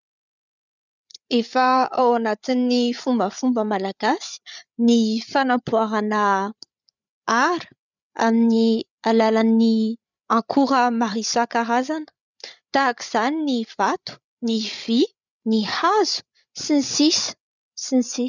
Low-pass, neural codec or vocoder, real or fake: 7.2 kHz; codec, 16 kHz, 8 kbps, FreqCodec, larger model; fake